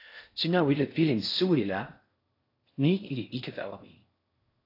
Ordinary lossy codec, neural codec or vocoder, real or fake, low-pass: AAC, 32 kbps; codec, 16 kHz in and 24 kHz out, 0.6 kbps, FocalCodec, streaming, 4096 codes; fake; 5.4 kHz